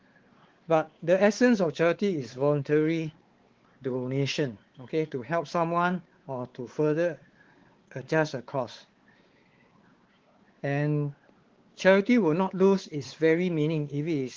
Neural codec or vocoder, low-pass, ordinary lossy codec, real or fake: codec, 16 kHz, 4 kbps, X-Codec, WavLM features, trained on Multilingual LibriSpeech; 7.2 kHz; Opus, 16 kbps; fake